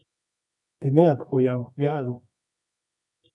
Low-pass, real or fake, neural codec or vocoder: 10.8 kHz; fake; codec, 24 kHz, 0.9 kbps, WavTokenizer, medium music audio release